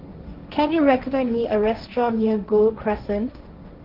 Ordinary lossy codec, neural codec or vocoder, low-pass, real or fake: Opus, 32 kbps; codec, 16 kHz, 1.1 kbps, Voila-Tokenizer; 5.4 kHz; fake